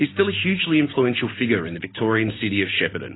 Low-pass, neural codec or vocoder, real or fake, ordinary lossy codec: 7.2 kHz; none; real; AAC, 16 kbps